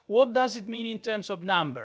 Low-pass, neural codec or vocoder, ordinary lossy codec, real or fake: none; codec, 16 kHz, about 1 kbps, DyCAST, with the encoder's durations; none; fake